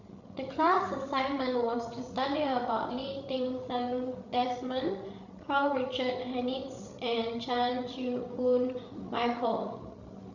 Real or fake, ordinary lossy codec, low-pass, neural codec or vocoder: fake; none; 7.2 kHz; codec, 16 kHz, 8 kbps, FreqCodec, larger model